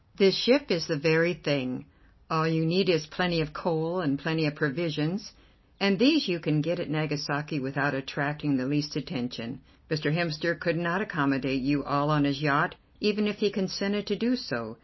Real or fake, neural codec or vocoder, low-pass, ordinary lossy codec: real; none; 7.2 kHz; MP3, 24 kbps